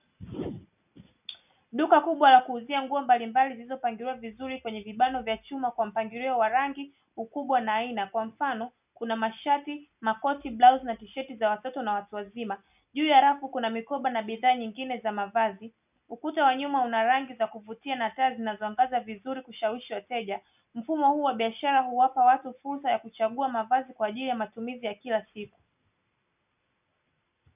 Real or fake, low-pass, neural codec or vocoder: real; 3.6 kHz; none